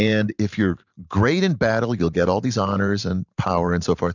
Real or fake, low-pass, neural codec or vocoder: fake; 7.2 kHz; vocoder, 44.1 kHz, 128 mel bands every 512 samples, BigVGAN v2